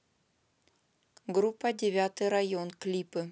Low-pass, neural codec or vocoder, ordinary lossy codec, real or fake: none; none; none; real